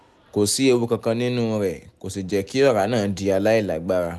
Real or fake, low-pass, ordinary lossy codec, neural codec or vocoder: real; none; none; none